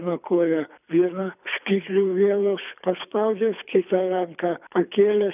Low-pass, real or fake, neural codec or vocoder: 3.6 kHz; fake; codec, 24 kHz, 3 kbps, HILCodec